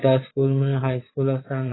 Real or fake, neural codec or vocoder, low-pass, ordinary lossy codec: fake; vocoder, 22.05 kHz, 80 mel bands, Vocos; 7.2 kHz; AAC, 16 kbps